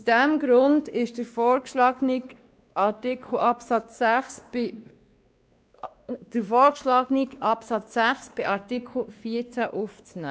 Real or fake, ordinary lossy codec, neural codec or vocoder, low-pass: fake; none; codec, 16 kHz, 2 kbps, X-Codec, WavLM features, trained on Multilingual LibriSpeech; none